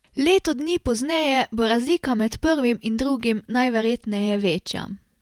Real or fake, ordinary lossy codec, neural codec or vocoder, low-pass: fake; Opus, 32 kbps; vocoder, 48 kHz, 128 mel bands, Vocos; 19.8 kHz